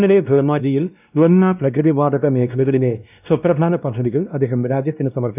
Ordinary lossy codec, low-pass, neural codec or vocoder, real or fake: none; 3.6 kHz; codec, 16 kHz, 1 kbps, X-Codec, WavLM features, trained on Multilingual LibriSpeech; fake